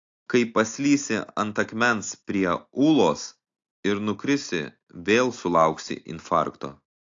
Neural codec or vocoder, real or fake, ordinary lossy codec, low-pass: none; real; AAC, 48 kbps; 7.2 kHz